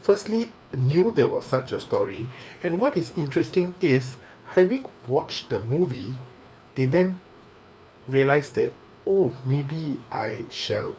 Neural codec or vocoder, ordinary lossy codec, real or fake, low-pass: codec, 16 kHz, 2 kbps, FreqCodec, larger model; none; fake; none